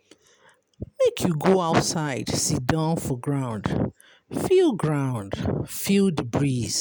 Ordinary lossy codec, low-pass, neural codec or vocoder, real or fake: none; none; none; real